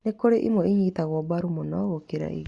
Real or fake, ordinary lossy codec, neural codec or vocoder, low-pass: real; Opus, 64 kbps; none; 10.8 kHz